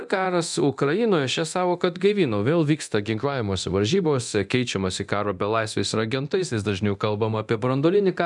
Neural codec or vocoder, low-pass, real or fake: codec, 24 kHz, 0.9 kbps, DualCodec; 10.8 kHz; fake